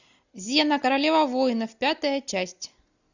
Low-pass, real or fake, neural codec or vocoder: 7.2 kHz; real; none